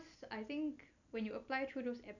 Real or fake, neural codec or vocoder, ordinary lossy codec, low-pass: real; none; none; 7.2 kHz